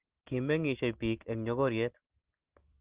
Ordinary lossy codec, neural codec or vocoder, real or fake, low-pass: Opus, 16 kbps; none; real; 3.6 kHz